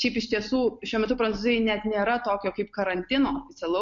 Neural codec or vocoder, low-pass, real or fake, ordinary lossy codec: none; 7.2 kHz; real; MP3, 48 kbps